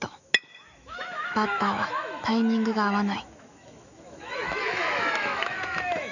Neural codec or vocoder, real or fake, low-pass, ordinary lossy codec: autoencoder, 48 kHz, 128 numbers a frame, DAC-VAE, trained on Japanese speech; fake; 7.2 kHz; none